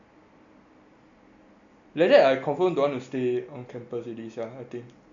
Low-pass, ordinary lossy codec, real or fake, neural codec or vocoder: 7.2 kHz; AAC, 64 kbps; real; none